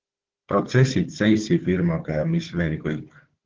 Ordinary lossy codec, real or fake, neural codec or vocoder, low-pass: Opus, 16 kbps; fake; codec, 16 kHz, 4 kbps, FunCodec, trained on Chinese and English, 50 frames a second; 7.2 kHz